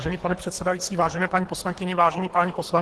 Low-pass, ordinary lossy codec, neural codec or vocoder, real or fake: 10.8 kHz; Opus, 16 kbps; codec, 24 kHz, 3 kbps, HILCodec; fake